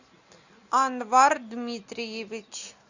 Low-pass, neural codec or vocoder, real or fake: 7.2 kHz; none; real